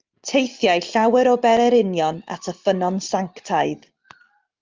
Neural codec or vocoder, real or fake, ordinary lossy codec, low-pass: none; real; Opus, 24 kbps; 7.2 kHz